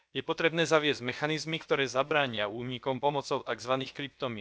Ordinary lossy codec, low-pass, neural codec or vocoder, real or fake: none; none; codec, 16 kHz, about 1 kbps, DyCAST, with the encoder's durations; fake